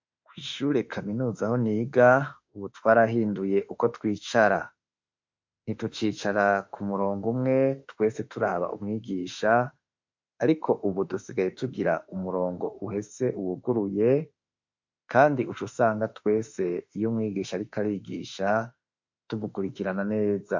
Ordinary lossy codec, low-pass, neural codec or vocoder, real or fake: MP3, 48 kbps; 7.2 kHz; autoencoder, 48 kHz, 32 numbers a frame, DAC-VAE, trained on Japanese speech; fake